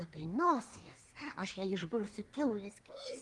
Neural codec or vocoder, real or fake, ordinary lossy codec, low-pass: codec, 24 kHz, 1 kbps, SNAC; fake; Opus, 24 kbps; 10.8 kHz